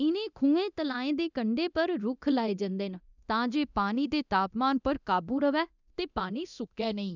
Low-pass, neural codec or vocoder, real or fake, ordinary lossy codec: 7.2 kHz; codec, 24 kHz, 0.9 kbps, DualCodec; fake; none